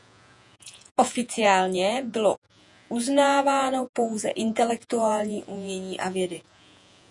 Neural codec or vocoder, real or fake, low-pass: vocoder, 48 kHz, 128 mel bands, Vocos; fake; 10.8 kHz